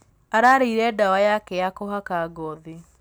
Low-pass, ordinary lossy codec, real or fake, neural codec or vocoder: none; none; real; none